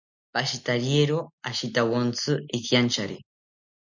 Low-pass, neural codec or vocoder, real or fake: 7.2 kHz; none; real